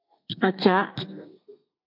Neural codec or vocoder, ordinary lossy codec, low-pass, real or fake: codec, 32 kHz, 1.9 kbps, SNAC; MP3, 48 kbps; 5.4 kHz; fake